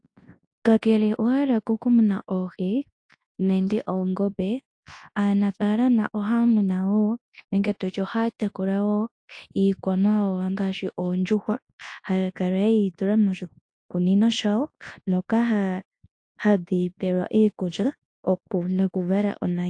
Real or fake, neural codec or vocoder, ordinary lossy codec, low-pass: fake; codec, 24 kHz, 0.9 kbps, WavTokenizer, large speech release; AAC, 48 kbps; 9.9 kHz